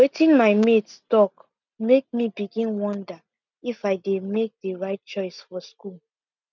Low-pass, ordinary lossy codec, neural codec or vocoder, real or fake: 7.2 kHz; none; none; real